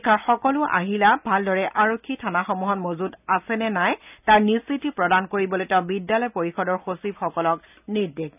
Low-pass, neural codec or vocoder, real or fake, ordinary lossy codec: 3.6 kHz; none; real; none